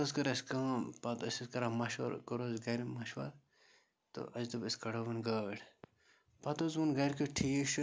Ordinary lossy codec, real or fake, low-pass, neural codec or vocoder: none; real; none; none